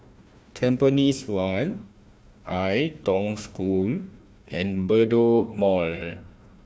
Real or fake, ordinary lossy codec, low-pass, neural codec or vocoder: fake; none; none; codec, 16 kHz, 1 kbps, FunCodec, trained on Chinese and English, 50 frames a second